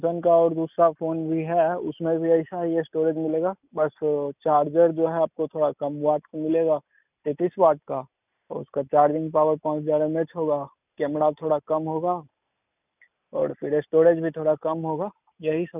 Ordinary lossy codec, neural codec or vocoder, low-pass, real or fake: none; none; 3.6 kHz; real